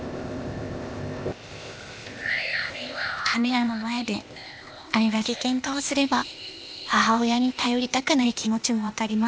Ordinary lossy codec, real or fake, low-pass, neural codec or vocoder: none; fake; none; codec, 16 kHz, 0.8 kbps, ZipCodec